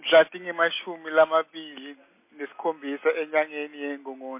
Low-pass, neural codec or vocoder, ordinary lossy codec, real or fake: 3.6 kHz; none; MP3, 24 kbps; real